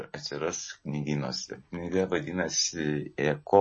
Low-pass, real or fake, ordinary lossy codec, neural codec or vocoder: 10.8 kHz; real; MP3, 32 kbps; none